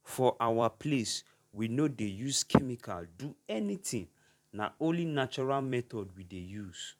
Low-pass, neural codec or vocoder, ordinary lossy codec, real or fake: 19.8 kHz; vocoder, 44.1 kHz, 128 mel bands every 256 samples, BigVGAN v2; none; fake